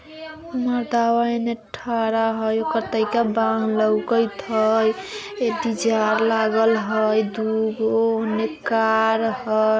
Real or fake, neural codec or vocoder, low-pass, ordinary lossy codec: real; none; none; none